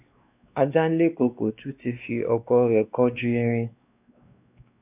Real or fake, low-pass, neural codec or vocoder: fake; 3.6 kHz; codec, 16 kHz, 2 kbps, X-Codec, WavLM features, trained on Multilingual LibriSpeech